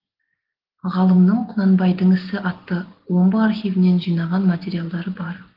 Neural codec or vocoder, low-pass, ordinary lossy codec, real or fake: none; 5.4 kHz; Opus, 16 kbps; real